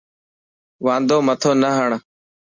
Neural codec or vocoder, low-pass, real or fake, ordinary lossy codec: none; 7.2 kHz; real; Opus, 64 kbps